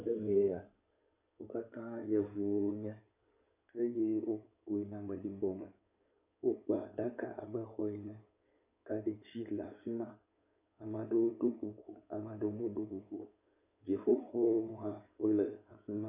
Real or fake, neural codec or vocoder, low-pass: fake; codec, 16 kHz in and 24 kHz out, 2.2 kbps, FireRedTTS-2 codec; 3.6 kHz